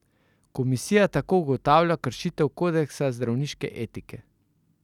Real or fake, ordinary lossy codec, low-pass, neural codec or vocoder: real; none; 19.8 kHz; none